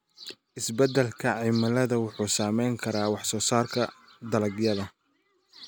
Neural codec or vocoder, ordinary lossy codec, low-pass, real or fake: none; none; none; real